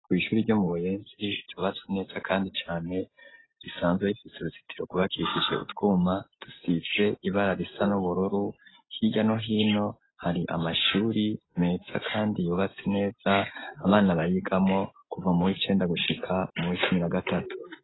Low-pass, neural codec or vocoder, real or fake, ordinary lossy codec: 7.2 kHz; none; real; AAC, 16 kbps